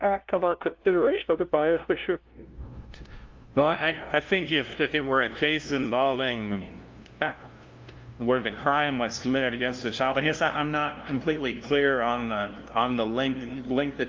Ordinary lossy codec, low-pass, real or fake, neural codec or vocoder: Opus, 32 kbps; 7.2 kHz; fake; codec, 16 kHz, 0.5 kbps, FunCodec, trained on LibriTTS, 25 frames a second